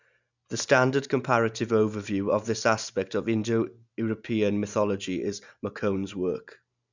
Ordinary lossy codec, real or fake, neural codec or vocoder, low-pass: none; real; none; 7.2 kHz